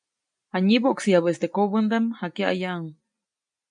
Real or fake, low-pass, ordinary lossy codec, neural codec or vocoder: real; 9.9 kHz; MP3, 96 kbps; none